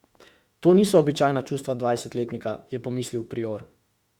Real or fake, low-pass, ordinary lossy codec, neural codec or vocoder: fake; 19.8 kHz; Opus, 64 kbps; autoencoder, 48 kHz, 32 numbers a frame, DAC-VAE, trained on Japanese speech